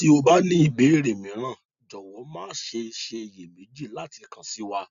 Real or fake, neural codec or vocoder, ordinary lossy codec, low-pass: real; none; none; 7.2 kHz